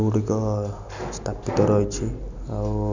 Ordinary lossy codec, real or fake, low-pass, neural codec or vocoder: none; real; 7.2 kHz; none